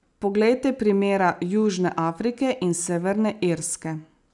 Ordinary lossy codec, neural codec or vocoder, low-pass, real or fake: none; none; 10.8 kHz; real